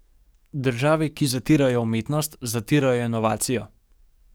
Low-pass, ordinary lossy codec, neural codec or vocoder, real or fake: none; none; codec, 44.1 kHz, 7.8 kbps, DAC; fake